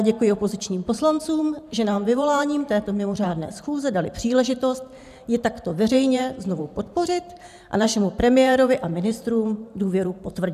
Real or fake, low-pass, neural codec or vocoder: fake; 14.4 kHz; vocoder, 44.1 kHz, 128 mel bands every 512 samples, BigVGAN v2